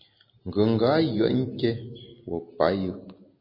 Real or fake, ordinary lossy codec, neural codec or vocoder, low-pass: real; MP3, 24 kbps; none; 5.4 kHz